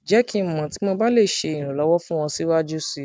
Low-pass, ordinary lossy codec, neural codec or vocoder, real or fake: none; none; none; real